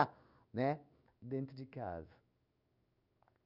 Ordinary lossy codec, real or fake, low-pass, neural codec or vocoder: none; fake; 5.4 kHz; codec, 16 kHz in and 24 kHz out, 1 kbps, XY-Tokenizer